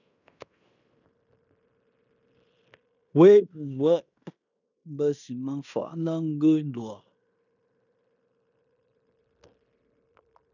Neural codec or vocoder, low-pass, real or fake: codec, 16 kHz in and 24 kHz out, 0.9 kbps, LongCat-Audio-Codec, fine tuned four codebook decoder; 7.2 kHz; fake